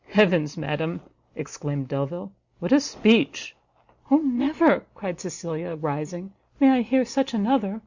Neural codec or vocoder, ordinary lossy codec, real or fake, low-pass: none; Opus, 64 kbps; real; 7.2 kHz